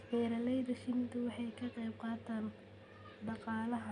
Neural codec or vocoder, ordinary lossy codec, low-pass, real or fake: none; none; 9.9 kHz; real